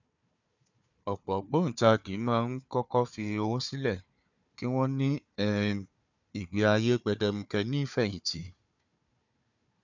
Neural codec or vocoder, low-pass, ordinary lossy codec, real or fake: codec, 16 kHz, 4 kbps, FunCodec, trained on Chinese and English, 50 frames a second; 7.2 kHz; none; fake